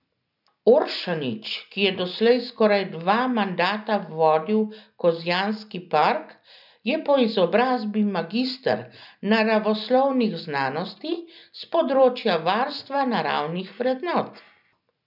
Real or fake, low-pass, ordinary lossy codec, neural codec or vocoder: real; 5.4 kHz; none; none